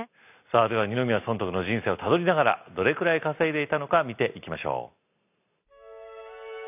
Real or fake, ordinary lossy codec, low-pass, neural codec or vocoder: real; none; 3.6 kHz; none